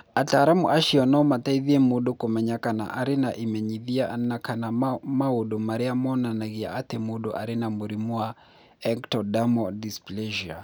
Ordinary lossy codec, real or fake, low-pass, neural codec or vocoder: none; real; none; none